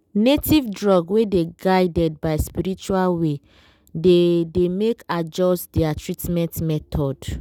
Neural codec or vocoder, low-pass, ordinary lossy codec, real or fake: none; 19.8 kHz; none; real